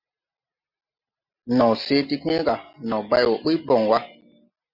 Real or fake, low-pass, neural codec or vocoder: real; 5.4 kHz; none